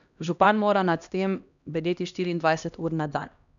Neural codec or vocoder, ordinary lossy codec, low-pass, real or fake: codec, 16 kHz, 1 kbps, X-Codec, HuBERT features, trained on LibriSpeech; none; 7.2 kHz; fake